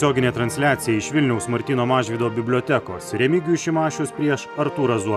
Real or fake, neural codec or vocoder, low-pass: real; none; 14.4 kHz